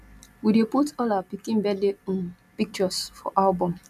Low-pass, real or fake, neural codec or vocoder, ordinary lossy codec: 14.4 kHz; real; none; none